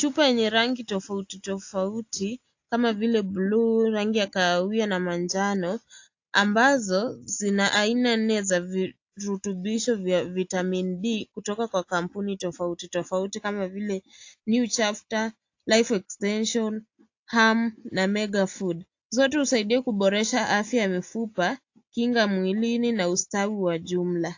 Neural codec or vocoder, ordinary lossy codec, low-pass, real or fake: none; AAC, 48 kbps; 7.2 kHz; real